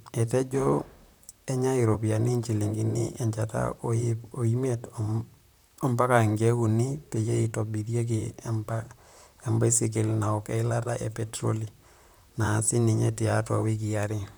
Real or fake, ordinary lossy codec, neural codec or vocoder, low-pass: fake; none; vocoder, 44.1 kHz, 128 mel bands, Pupu-Vocoder; none